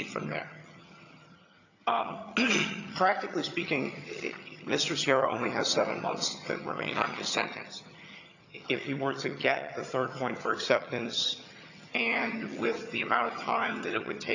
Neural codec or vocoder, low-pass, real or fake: vocoder, 22.05 kHz, 80 mel bands, HiFi-GAN; 7.2 kHz; fake